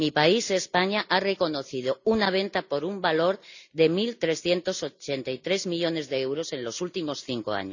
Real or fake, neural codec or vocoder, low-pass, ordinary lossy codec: real; none; 7.2 kHz; none